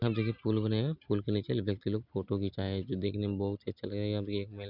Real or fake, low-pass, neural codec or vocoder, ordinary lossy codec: real; 5.4 kHz; none; none